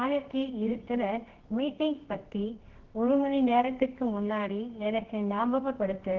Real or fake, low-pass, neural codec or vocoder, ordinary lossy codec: fake; 7.2 kHz; codec, 24 kHz, 0.9 kbps, WavTokenizer, medium music audio release; Opus, 16 kbps